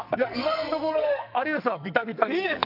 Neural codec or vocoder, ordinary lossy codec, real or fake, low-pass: codec, 44.1 kHz, 2.6 kbps, SNAC; none; fake; 5.4 kHz